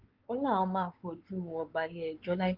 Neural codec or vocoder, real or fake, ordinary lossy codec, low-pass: codec, 16 kHz, 4 kbps, X-Codec, WavLM features, trained on Multilingual LibriSpeech; fake; Opus, 16 kbps; 5.4 kHz